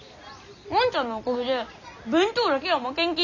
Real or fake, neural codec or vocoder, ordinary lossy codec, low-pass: real; none; none; 7.2 kHz